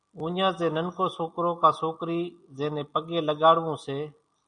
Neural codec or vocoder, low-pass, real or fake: none; 9.9 kHz; real